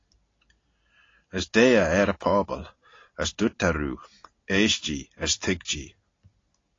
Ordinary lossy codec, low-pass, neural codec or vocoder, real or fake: AAC, 32 kbps; 7.2 kHz; none; real